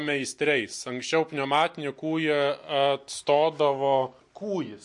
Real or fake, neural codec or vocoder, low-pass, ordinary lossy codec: real; none; 10.8 kHz; MP3, 48 kbps